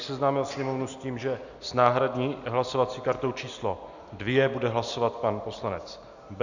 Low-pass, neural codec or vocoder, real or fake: 7.2 kHz; none; real